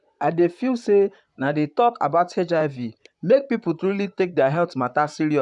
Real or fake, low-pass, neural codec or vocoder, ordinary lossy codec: fake; 10.8 kHz; vocoder, 44.1 kHz, 128 mel bands, Pupu-Vocoder; none